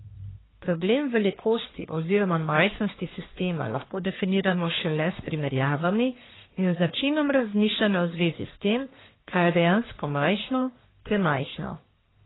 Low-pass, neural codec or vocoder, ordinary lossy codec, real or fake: 7.2 kHz; codec, 44.1 kHz, 1.7 kbps, Pupu-Codec; AAC, 16 kbps; fake